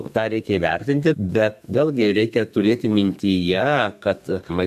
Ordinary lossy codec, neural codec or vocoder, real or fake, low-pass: MP3, 96 kbps; codec, 32 kHz, 1.9 kbps, SNAC; fake; 14.4 kHz